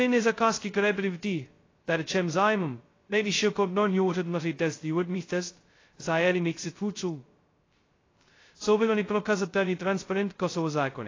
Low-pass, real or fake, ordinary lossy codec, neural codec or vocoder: 7.2 kHz; fake; AAC, 32 kbps; codec, 16 kHz, 0.2 kbps, FocalCodec